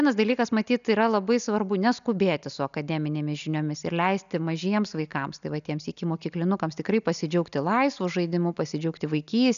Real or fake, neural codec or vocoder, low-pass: real; none; 7.2 kHz